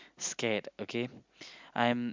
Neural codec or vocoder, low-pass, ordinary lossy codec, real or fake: none; 7.2 kHz; MP3, 64 kbps; real